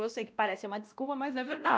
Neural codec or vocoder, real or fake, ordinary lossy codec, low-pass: codec, 16 kHz, 1 kbps, X-Codec, WavLM features, trained on Multilingual LibriSpeech; fake; none; none